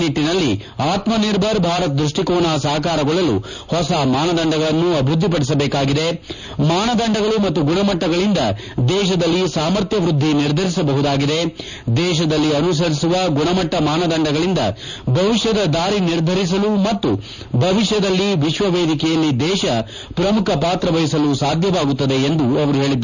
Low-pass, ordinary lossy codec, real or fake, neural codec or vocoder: 7.2 kHz; none; real; none